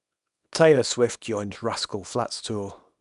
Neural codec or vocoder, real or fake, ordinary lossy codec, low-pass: codec, 24 kHz, 0.9 kbps, WavTokenizer, small release; fake; none; 10.8 kHz